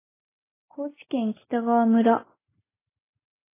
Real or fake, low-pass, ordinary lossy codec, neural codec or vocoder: real; 3.6 kHz; AAC, 16 kbps; none